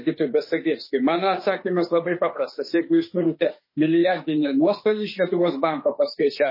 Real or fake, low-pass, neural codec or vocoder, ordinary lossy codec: fake; 5.4 kHz; autoencoder, 48 kHz, 32 numbers a frame, DAC-VAE, trained on Japanese speech; MP3, 24 kbps